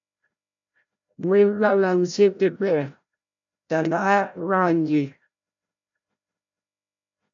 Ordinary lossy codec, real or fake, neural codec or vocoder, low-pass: AAC, 64 kbps; fake; codec, 16 kHz, 0.5 kbps, FreqCodec, larger model; 7.2 kHz